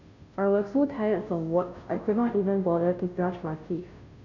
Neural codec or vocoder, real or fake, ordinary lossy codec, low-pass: codec, 16 kHz, 0.5 kbps, FunCodec, trained on Chinese and English, 25 frames a second; fake; none; 7.2 kHz